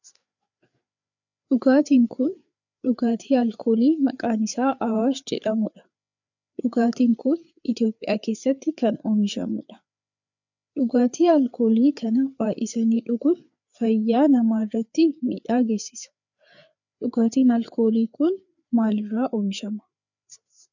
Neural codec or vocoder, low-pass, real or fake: codec, 16 kHz, 4 kbps, FreqCodec, larger model; 7.2 kHz; fake